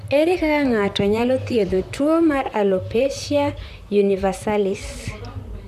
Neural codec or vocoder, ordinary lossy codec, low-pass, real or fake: vocoder, 44.1 kHz, 128 mel bands, Pupu-Vocoder; none; 14.4 kHz; fake